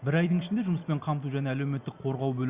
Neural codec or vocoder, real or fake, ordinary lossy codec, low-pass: none; real; Opus, 64 kbps; 3.6 kHz